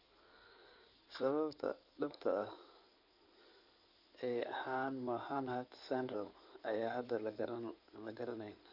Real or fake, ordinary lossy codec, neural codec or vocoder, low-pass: fake; none; codec, 16 kHz in and 24 kHz out, 2.2 kbps, FireRedTTS-2 codec; 5.4 kHz